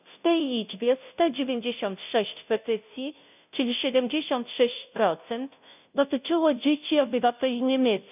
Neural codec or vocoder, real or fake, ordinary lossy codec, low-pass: codec, 16 kHz, 0.5 kbps, FunCodec, trained on Chinese and English, 25 frames a second; fake; none; 3.6 kHz